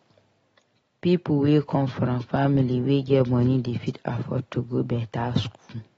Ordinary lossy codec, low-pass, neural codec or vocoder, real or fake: AAC, 24 kbps; 19.8 kHz; none; real